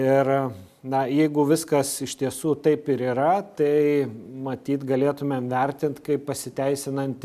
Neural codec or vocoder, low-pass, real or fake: none; 14.4 kHz; real